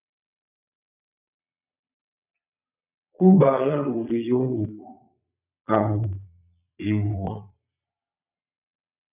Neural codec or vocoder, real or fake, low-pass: vocoder, 22.05 kHz, 80 mel bands, Vocos; fake; 3.6 kHz